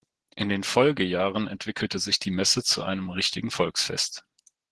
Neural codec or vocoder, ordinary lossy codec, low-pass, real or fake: none; Opus, 16 kbps; 10.8 kHz; real